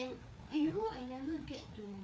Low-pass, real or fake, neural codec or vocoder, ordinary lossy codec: none; fake; codec, 16 kHz, 4 kbps, FreqCodec, larger model; none